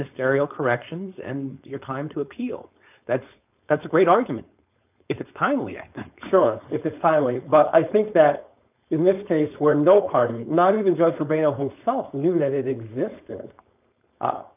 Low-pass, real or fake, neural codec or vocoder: 3.6 kHz; fake; codec, 16 kHz, 4.8 kbps, FACodec